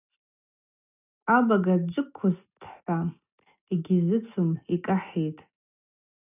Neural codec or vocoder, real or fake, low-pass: none; real; 3.6 kHz